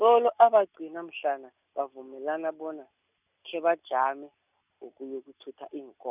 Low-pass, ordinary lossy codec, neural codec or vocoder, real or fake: 3.6 kHz; none; none; real